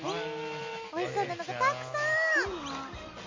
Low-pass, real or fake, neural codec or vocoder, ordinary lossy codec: 7.2 kHz; real; none; MP3, 32 kbps